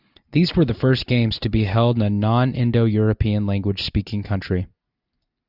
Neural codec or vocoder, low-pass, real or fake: none; 5.4 kHz; real